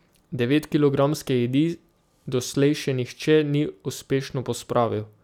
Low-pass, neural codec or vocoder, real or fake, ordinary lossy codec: 19.8 kHz; none; real; none